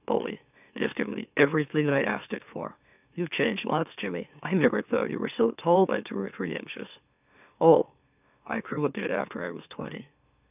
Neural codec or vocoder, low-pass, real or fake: autoencoder, 44.1 kHz, a latent of 192 numbers a frame, MeloTTS; 3.6 kHz; fake